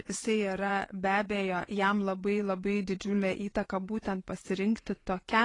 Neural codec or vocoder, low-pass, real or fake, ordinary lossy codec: vocoder, 24 kHz, 100 mel bands, Vocos; 10.8 kHz; fake; AAC, 32 kbps